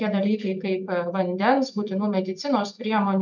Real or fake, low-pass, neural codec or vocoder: real; 7.2 kHz; none